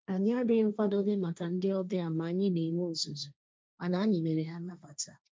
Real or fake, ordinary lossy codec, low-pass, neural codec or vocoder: fake; none; none; codec, 16 kHz, 1.1 kbps, Voila-Tokenizer